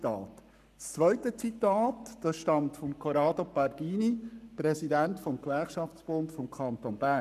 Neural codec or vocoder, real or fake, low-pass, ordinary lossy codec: codec, 44.1 kHz, 7.8 kbps, Pupu-Codec; fake; 14.4 kHz; none